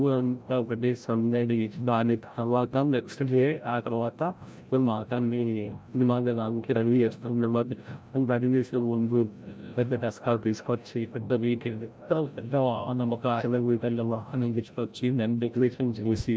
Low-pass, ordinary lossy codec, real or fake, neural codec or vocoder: none; none; fake; codec, 16 kHz, 0.5 kbps, FreqCodec, larger model